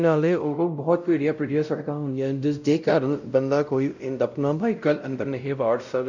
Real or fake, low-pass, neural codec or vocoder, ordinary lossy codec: fake; 7.2 kHz; codec, 16 kHz, 0.5 kbps, X-Codec, WavLM features, trained on Multilingual LibriSpeech; none